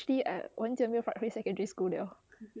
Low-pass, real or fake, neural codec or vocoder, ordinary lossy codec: none; real; none; none